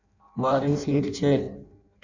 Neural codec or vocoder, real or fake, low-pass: codec, 16 kHz in and 24 kHz out, 0.6 kbps, FireRedTTS-2 codec; fake; 7.2 kHz